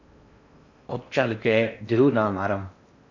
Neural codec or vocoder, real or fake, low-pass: codec, 16 kHz in and 24 kHz out, 0.6 kbps, FocalCodec, streaming, 4096 codes; fake; 7.2 kHz